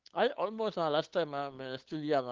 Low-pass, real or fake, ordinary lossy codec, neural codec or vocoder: 7.2 kHz; fake; Opus, 16 kbps; codec, 16 kHz, 8 kbps, FunCodec, trained on LibriTTS, 25 frames a second